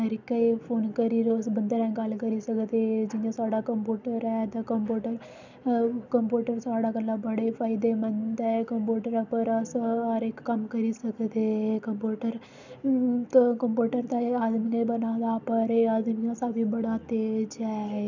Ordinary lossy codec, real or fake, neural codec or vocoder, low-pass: none; real; none; 7.2 kHz